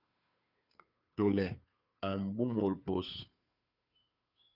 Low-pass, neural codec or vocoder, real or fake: 5.4 kHz; codec, 16 kHz, 2 kbps, FunCodec, trained on Chinese and English, 25 frames a second; fake